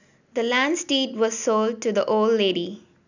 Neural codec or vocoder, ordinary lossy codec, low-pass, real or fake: none; none; 7.2 kHz; real